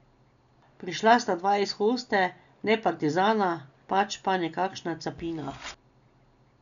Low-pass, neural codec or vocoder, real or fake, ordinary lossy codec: 7.2 kHz; none; real; none